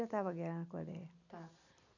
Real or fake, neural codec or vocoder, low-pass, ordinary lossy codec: real; none; 7.2 kHz; none